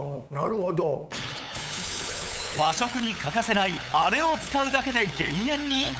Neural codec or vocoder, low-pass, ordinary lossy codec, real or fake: codec, 16 kHz, 8 kbps, FunCodec, trained on LibriTTS, 25 frames a second; none; none; fake